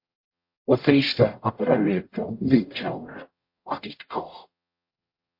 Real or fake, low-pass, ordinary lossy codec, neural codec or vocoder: fake; 5.4 kHz; MP3, 32 kbps; codec, 44.1 kHz, 0.9 kbps, DAC